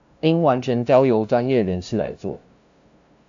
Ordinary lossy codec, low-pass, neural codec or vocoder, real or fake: AAC, 64 kbps; 7.2 kHz; codec, 16 kHz, 0.5 kbps, FunCodec, trained on LibriTTS, 25 frames a second; fake